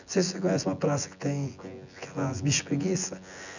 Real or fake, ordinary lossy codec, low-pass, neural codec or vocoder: fake; none; 7.2 kHz; vocoder, 24 kHz, 100 mel bands, Vocos